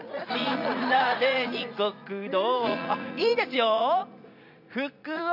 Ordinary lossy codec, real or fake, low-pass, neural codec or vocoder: none; fake; 5.4 kHz; vocoder, 44.1 kHz, 80 mel bands, Vocos